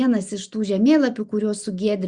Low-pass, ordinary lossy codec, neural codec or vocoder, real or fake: 9.9 kHz; Opus, 64 kbps; none; real